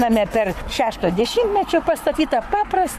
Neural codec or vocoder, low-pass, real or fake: codec, 44.1 kHz, 7.8 kbps, Pupu-Codec; 14.4 kHz; fake